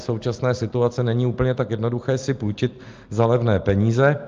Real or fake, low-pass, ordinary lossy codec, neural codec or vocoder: real; 7.2 kHz; Opus, 24 kbps; none